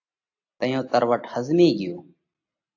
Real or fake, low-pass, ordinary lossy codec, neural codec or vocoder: real; 7.2 kHz; AAC, 48 kbps; none